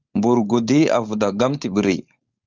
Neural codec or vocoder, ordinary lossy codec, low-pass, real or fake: codec, 16 kHz, 4.8 kbps, FACodec; Opus, 32 kbps; 7.2 kHz; fake